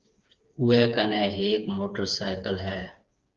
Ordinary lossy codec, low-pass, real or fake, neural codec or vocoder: Opus, 32 kbps; 7.2 kHz; fake; codec, 16 kHz, 4 kbps, FreqCodec, smaller model